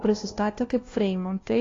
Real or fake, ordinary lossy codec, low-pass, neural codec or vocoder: fake; AAC, 32 kbps; 7.2 kHz; codec, 16 kHz, 1 kbps, FunCodec, trained on LibriTTS, 50 frames a second